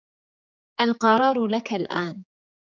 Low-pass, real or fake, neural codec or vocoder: 7.2 kHz; fake; codec, 16 kHz, 4 kbps, X-Codec, HuBERT features, trained on general audio